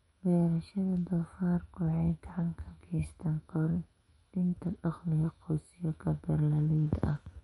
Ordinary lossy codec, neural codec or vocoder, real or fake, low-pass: MP3, 48 kbps; autoencoder, 48 kHz, 128 numbers a frame, DAC-VAE, trained on Japanese speech; fake; 19.8 kHz